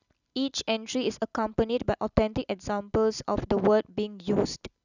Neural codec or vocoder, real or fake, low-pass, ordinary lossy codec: none; real; 7.2 kHz; none